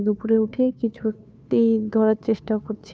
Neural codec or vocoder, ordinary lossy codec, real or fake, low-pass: codec, 16 kHz, 2 kbps, FunCodec, trained on Chinese and English, 25 frames a second; none; fake; none